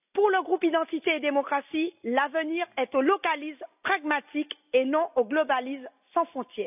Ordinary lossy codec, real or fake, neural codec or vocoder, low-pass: none; real; none; 3.6 kHz